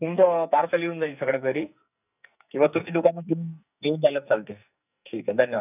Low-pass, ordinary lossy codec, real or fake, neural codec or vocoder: 3.6 kHz; none; fake; codec, 44.1 kHz, 2.6 kbps, SNAC